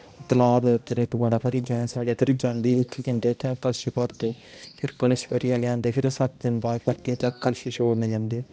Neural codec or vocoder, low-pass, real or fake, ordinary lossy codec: codec, 16 kHz, 1 kbps, X-Codec, HuBERT features, trained on balanced general audio; none; fake; none